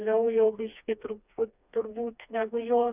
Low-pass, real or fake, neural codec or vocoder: 3.6 kHz; fake; codec, 16 kHz, 2 kbps, FreqCodec, smaller model